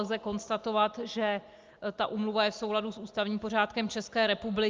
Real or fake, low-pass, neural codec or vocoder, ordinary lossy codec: real; 7.2 kHz; none; Opus, 24 kbps